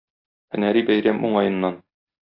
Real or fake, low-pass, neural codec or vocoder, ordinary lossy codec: real; 5.4 kHz; none; AAC, 32 kbps